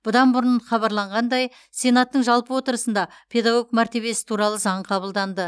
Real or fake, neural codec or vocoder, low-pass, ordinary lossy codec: real; none; none; none